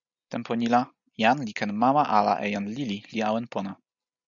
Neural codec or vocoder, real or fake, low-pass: none; real; 7.2 kHz